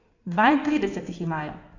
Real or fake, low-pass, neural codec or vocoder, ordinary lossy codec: fake; 7.2 kHz; codec, 16 kHz in and 24 kHz out, 2.2 kbps, FireRedTTS-2 codec; none